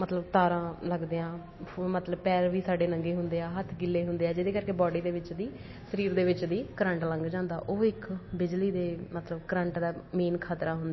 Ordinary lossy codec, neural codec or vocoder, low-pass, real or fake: MP3, 24 kbps; none; 7.2 kHz; real